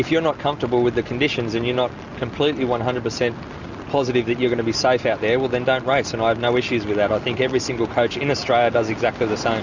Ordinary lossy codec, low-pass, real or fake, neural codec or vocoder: Opus, 64 kbps; 7.2 kHz; real; none